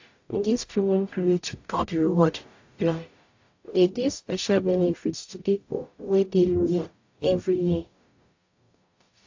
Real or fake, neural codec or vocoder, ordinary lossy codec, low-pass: fake; codec, 44.1 kHz, 0.9 kbps, DAC; AAC, 48 kbps; 7.2 kHz